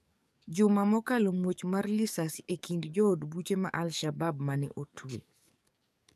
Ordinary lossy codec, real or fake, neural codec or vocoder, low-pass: none; fake; codec, 44.1 kHz, 7.8 kbps, DAC; 14.4 kHz